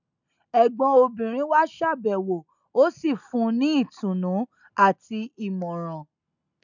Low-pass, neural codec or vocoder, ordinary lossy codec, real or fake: 7.2 kHz; none; none; real